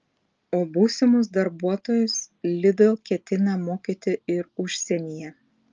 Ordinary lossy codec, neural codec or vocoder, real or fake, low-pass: Opus, 24 kbps; none; real; 7.2 kHz